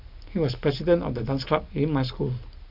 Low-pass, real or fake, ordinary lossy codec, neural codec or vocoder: 5.4 kHz; real; none; none